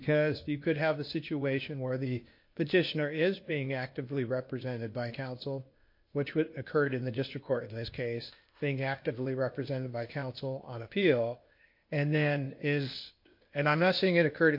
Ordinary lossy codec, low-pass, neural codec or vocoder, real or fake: MP3, 32 kbps; 5.4 kHz; codec, 16 kHz, 0.8 kbps, ZipCodec; fake